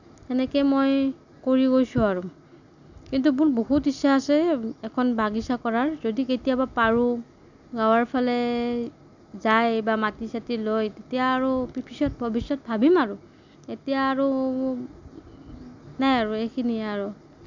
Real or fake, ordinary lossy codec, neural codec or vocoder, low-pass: real; none; none; 7.2 kHz